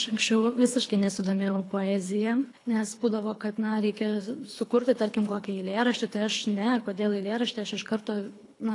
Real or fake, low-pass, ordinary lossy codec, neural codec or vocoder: fake; 10.8 kHz; AAC, 48 kbps; codec, 24 kHz, 3 kbps, HILCodec